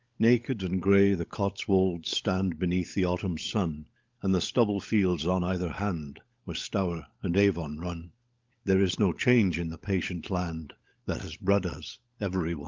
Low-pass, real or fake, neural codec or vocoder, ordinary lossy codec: 7.2 kHz; fake; codec, 16 kHz, 16 kbps, FunCodec, trained on LibriTTS, 50 frames a second; Opus, 24 kbps